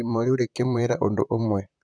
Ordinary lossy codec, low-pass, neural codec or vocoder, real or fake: none; 9.9 kHz; vocoder, 44.1 kHz, 128 mel bands, Pupu-Vocoder; fake